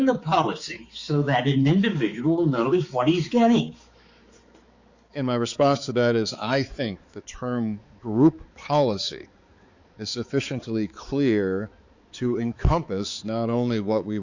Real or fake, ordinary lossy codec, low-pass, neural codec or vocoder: fake; Opus, 64 kbps; 7.2 kHz; codec, 16 kHz, 4 kbps, X-Codec, HuBERT features, trained on balanced general audio